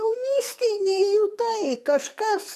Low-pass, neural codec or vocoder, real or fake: 14.4 kHz; codec, 44.1 kHz, 2.6 kbps, SNAC; fake